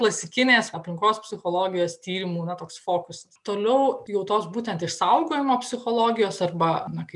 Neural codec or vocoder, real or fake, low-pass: none; real; 10.8 kHz